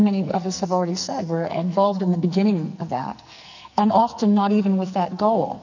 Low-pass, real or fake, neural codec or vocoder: 7.2 kHz; fake; codec, 44.1 kHz, 2.6 kbps, SNAC